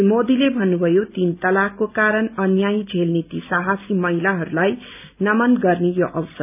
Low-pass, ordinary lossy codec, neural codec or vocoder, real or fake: 3.6 kHz; none; none; real